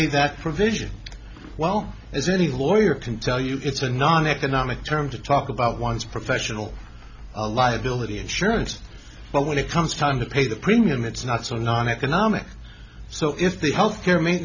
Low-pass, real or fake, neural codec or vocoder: 7.2 kHz; real; none